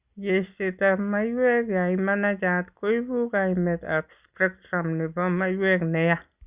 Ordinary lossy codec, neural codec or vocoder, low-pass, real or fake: Opus, 64 kbps; none; 3.6 kHz; real